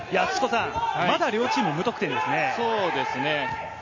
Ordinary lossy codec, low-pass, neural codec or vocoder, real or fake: MP3, 32 kbps; 7.2 kHz; none; real